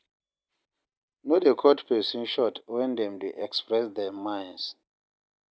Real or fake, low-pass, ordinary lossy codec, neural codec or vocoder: real; none; none; none